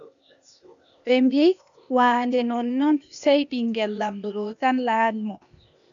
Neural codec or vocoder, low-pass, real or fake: codec, 16 kHz, 0.8 kbps, ZipCodec; 7.2 kHz; fake